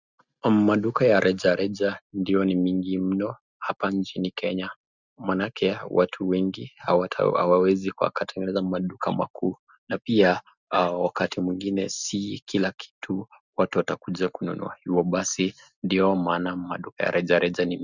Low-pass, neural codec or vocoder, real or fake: 7.2 kHz; none; real